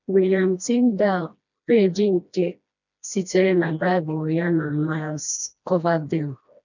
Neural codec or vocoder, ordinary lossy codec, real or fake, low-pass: codec, 16 kHz, 1 kbps, FreqCodec, smaller model; none; fake; 7.2 kHz